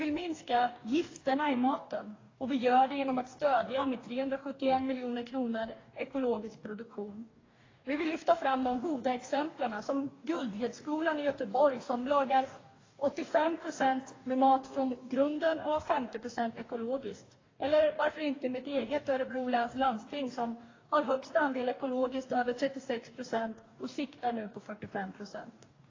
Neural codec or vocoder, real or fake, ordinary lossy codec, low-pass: codec, 44.1 kHz, 2.6 kbps, DAC; fake; AAC, 32 kbps; 7.2 kHz